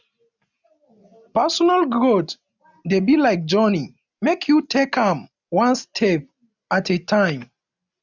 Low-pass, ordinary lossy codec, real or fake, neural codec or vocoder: 7.2 kHz; none; real; none